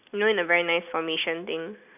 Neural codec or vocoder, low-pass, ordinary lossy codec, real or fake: none; 3.6 kHz; none; real